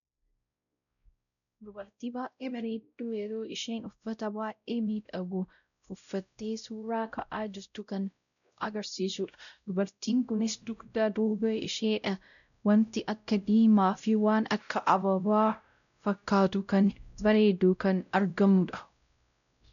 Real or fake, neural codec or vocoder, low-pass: fake; codec, 16 kHz, 0.5 kbps, X-Codec, WavLM features, trained on Multilingual LibriSpeech; 7.2 kHz